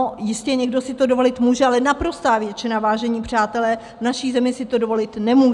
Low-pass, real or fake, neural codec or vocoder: 10.8 kHz; real; none